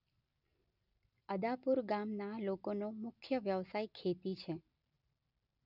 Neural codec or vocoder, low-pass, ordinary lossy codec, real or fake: none; 5.4 kHz; none; real